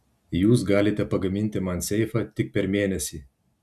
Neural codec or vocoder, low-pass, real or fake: none; 14.4 kHz; real